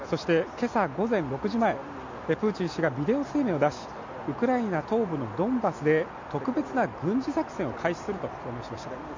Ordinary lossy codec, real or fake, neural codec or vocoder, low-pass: MP3, 64 kbps; real; none; 7.2 kHz